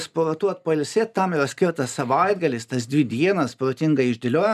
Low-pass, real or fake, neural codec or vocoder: 14.4 kHz; real; none